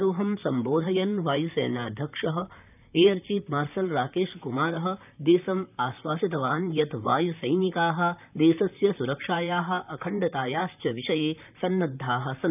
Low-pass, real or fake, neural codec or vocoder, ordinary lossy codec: 3.6 kHz; fake; vocoder, 44.1 kHz, 128 mel bands, Pupu-Vocoder; none